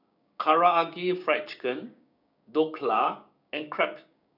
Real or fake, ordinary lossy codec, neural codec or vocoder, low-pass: fake; none; codec, 16 kHz, 6 kbps, DAC; 5.4 kHz